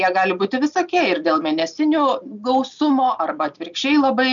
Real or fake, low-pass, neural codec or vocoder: real; 7.2 kHz; none